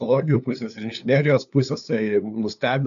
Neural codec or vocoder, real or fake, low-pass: codec, 16 kHz, 2 kbps, FunCodec, trained on LibriTTS, 25 frames a second; fake; 7.2 kHz